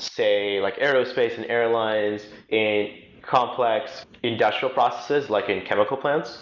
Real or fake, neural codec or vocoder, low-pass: real; none; 7.2 kHz